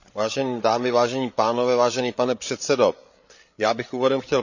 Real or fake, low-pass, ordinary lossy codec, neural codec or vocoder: fake; 7.2 kHz; none; codec, 16 kHz, 8 kbps, FreqCodec, larger model